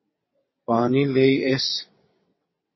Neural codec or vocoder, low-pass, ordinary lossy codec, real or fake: vocoder, 22.05 kHz, 80 mel bands, WaveNeXt; 7.2 kHz; MP3, 24 kbps; fake